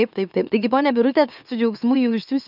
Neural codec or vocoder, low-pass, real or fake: autoencoder, 44.1 kHz, a latent of 192 numbers a frame, MeloTTS; 5.4 kHz; fake